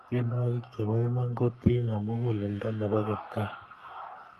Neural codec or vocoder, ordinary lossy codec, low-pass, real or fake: codec, 44.1 kHz, 3.4 kbps, Pupu-Codec; Opus, 32 kbps; 14.4 kHz; fake